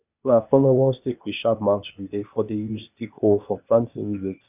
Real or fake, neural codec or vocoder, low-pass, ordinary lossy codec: fake; codec, 16 kHz, 0.8 kbps, ZipCodec; 3.6 kHz; none